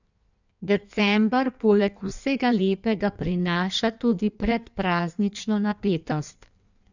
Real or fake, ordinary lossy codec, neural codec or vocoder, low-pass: fake; none; codec, 16 kHz in and 24 kHz out, 1.1 kbps, FireRedTTS-2 codec; 7.2 kHz